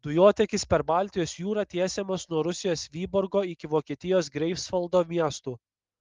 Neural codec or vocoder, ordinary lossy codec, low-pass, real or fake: none; Opus, 32 kbps; 7.2 kHz; real